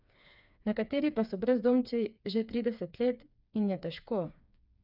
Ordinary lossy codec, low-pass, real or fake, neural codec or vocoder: none; 5.4 kHz; fake; codec, 16 kHz, 4 kbps, FreqCodec, smaller model